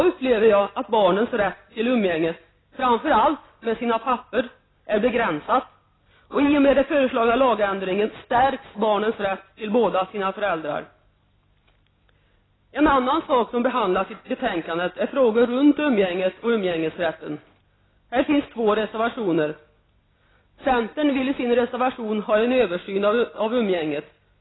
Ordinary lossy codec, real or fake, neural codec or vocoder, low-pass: AAC, 16 kbps; real; none; 7.2 kHz